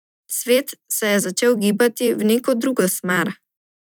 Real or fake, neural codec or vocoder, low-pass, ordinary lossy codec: fake; vocoder, 44.1 kHz, 128 mel bands every 256 samples, BigVGAN v2; none; none